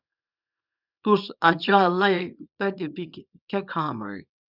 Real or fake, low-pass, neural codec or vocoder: fake; 5.4 kHz; codec, 24 kHz, 0.9 kbps, WavTokenizer, small release